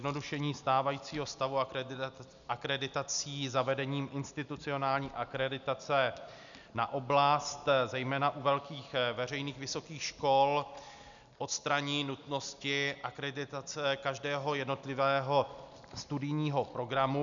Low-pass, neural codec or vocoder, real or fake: 7.2 kHz; none; real